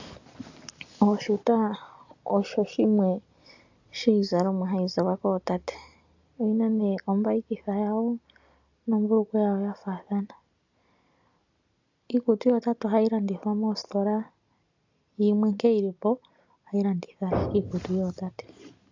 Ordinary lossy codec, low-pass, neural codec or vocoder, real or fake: AAC, 48 kbps; 7.2 kHz; none; real